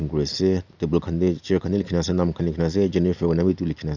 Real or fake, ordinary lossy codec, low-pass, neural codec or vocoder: real; none; 7.2 kHz; none